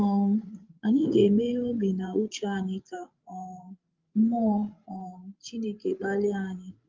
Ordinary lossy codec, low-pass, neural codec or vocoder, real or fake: Opus, 32 kbps; 7.2 kHz; codec, 16 kHz, 16 kbps, FreqCodec, larger model; fake